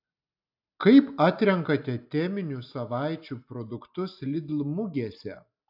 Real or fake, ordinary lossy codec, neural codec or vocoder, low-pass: real; AAC, 48 kbps; none; 5.4 kHz